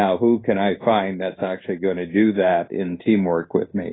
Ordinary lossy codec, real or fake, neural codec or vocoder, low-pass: AAC, 16 kbps; fake; codec, 16 kHz in and 24 kHz out, 1 kbps, XY-Tokenizer; 7.2 kHz